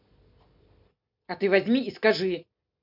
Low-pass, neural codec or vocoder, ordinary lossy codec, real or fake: 5.4 kHz; none; MP3, 48 kbps; real